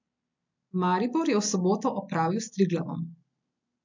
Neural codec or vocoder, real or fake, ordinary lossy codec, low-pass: none; real; MP3, 64 kbps; 7.2 kHz